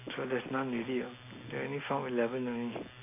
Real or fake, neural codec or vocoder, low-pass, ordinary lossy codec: real; none; 3.6 kHz; none